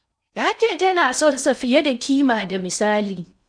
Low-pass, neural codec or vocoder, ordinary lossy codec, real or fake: 9.9 kHz; codec, 16 kHz in and 24 kHz out, 0.6 kbps, FocalCodec, streaming, 4096 codes; none; fake